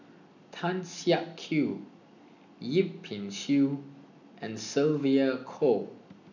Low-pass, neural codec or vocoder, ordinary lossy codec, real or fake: 7.2 kHz; none; none; real